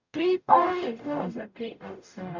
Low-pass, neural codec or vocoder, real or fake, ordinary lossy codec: 7.2 kHz; codec, 44.1 kHz, 0.9 kbps, DAC; fake; none